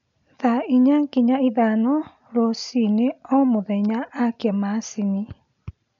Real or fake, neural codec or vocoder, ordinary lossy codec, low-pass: real; none; none; 7.2 kHz